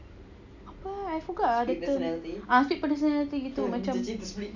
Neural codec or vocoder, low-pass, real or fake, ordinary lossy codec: none; 7.2 kHz; real; none